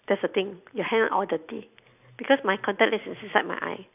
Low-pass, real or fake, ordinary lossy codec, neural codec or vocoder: 3.6 kHz; real; none; none